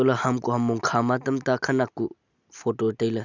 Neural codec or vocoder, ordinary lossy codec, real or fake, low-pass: none; none; real; 7.2 kHz